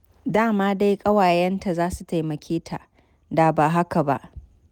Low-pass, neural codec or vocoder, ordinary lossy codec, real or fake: 19.8 kHz; none; none; real